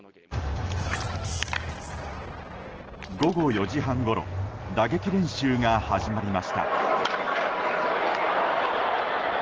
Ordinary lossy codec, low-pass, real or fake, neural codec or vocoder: Opus, 16 kbps; 7.2 kHz; real; none